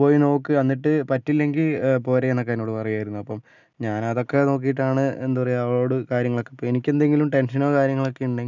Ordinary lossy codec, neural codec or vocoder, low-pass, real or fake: none; none; 7.2 kHz; real